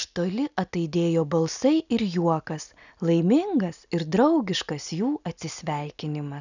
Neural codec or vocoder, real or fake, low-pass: none; real; 7.2 kHz